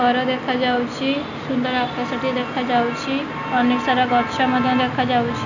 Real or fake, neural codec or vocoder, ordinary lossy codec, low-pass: real; none; none; 7.2 kHz